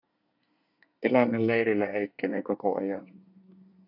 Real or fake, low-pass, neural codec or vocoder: fake; 5.4 kHz; codec, 24 kHz, 1 kbps, SNAC